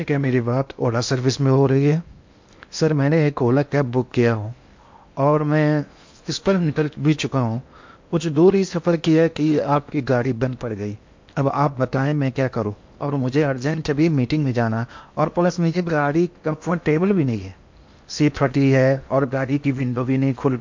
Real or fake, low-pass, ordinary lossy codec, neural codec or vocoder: fake; 7.2 kHz; MP3, 48 kbps; codec, 16 kHz in and 24 kHz out, 0.8 kbps, FocalCodec, streaming, 65536 codes